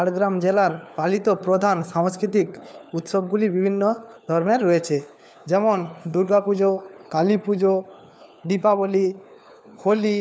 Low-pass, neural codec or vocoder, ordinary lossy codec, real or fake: none; codec, 16 kHz, 4 kbps, FunCodec, trained on LibriTTS, 50 frames a second; none; fake